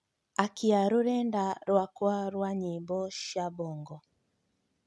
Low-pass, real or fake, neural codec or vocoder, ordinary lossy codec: none; real; none; none